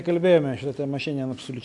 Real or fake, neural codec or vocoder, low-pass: real; none; 10.8 kHz